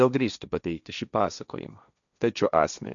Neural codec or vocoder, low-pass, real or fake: codec, 16 kHz, 1.1 kbps, Voila-Tokenizer; 7.2 kHz; fake